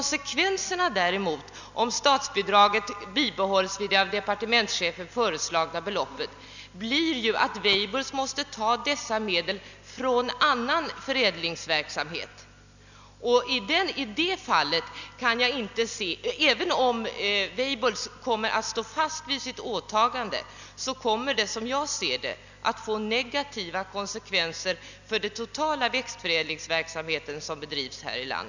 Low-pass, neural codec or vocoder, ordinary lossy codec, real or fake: 7.2 kHz; none; none; real